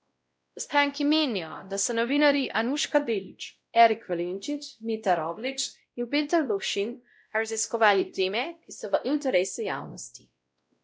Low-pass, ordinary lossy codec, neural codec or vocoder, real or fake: none; none; codec, 16 kHz, 0.5 kbps, X-Codec, WavLM features, trained on Multilingual LibriSpeech; fake